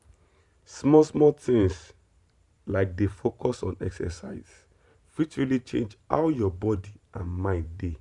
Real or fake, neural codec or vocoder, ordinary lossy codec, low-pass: fake; vocoder, 44.1 kHz, 128 mel bands every 512 samples, BigVGAN v2; none; 10.8 kHz